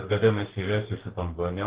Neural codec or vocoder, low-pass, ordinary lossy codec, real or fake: codec, 44.1 kHz, 2.6 kbps, DAC; 3.6 kHz; Opus, 16 kbps; fake